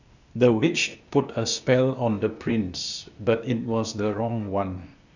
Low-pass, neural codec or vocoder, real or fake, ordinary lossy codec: 7.2 kHz; codec, 16 kHz, 0.8 kbps, ZipCodec; fake; none